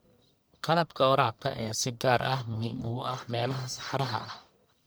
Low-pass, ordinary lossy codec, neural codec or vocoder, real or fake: none; none; codec, 44.1 kHz, 1.7 kbps, Pupu-Codec; fake